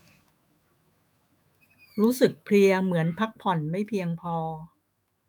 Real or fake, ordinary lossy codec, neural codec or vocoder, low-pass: fake; none; autoencoder, 48 kHz, 128 numbers a frame, DAC-VAE, trained on Japanese speech; none